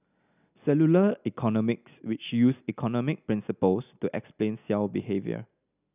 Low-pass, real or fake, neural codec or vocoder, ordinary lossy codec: 3.6 kHz; real; none; none